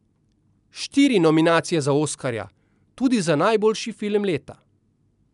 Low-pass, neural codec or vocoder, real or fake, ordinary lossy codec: 10.8 kHz; none; real; none